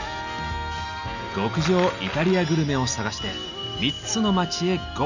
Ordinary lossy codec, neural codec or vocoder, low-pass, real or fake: none; none; 7.2 kHz; real